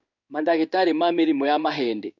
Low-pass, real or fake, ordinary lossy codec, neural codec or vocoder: 7.2 kHz; fake; none; codec, 16 kHz in and 24 kHz out, 1 kbps, XY-Tokenizer